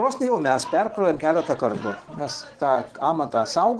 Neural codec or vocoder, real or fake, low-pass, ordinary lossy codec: vocoder, 22.05 kHz, 80 mel bands, Vocos; fake; 9.9 kHz; Opus, 16 kbps